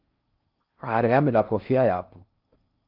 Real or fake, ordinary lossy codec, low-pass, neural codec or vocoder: fake; Opus, 32 kbps; 5.4 kHz; codec, 16 kHz in and 24 kHz out, 0.6 kbps, FocalCodec, streaming, 4096 codes